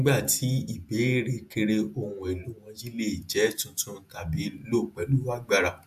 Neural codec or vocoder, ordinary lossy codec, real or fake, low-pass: vocoder, 48 kHz, 128 mel bands, Vocos; AAC, 96 kbps; fake; 14.4 kHz